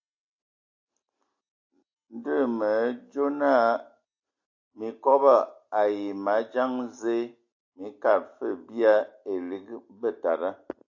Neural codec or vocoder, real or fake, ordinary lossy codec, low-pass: none; real; AAC, 48 kbps; 7.2 kHz